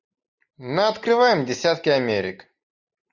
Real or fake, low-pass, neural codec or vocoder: real; 7.2 kHz; none